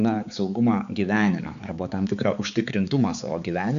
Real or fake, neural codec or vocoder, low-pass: fake; codec, 16 kHz, 4 kbps, X-Codec, HuBERT features, trained on balanced general audio; 7.2 kHz